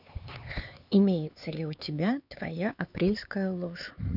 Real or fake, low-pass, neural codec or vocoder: fake; 5.4 kHz; codec, 16 kHz, 4 kbps, X-Codec, HuBERT features, trained on LibriSpeech